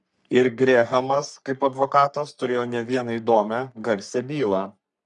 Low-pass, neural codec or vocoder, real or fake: 10.8 kHz; codec, 44.1 kHz, 3.4 kbps, Pupu-Codec; fake